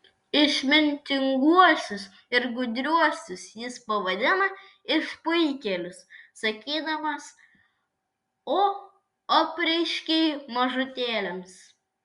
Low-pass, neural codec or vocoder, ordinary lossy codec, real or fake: 10.8 kHz; none; Opus, 64 kbps; real